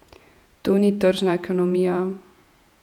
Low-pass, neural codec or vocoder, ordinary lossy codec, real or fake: 19.8 kHz; vocoder, 48 kHz, 128 mel bands, Vocos; none; fake